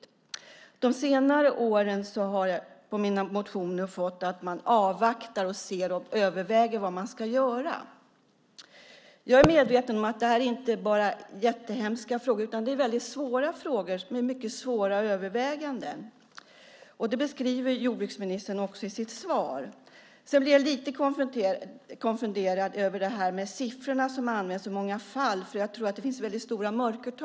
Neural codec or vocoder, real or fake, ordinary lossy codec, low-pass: none; real; none; none